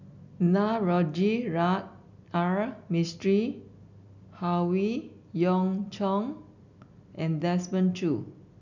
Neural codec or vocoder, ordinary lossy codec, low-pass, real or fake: none; none; 7.2 kHz; real